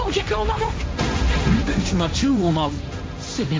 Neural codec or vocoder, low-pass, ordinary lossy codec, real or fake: codec, 16 kHz, 1.1 kbps, Voila-Tokenizer; none; none; fake